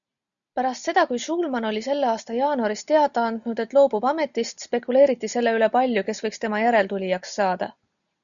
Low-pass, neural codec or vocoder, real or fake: 7.2 kHz; none; real